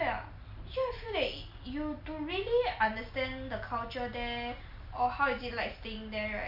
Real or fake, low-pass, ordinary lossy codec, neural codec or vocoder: real; 5.4 kHz; none; none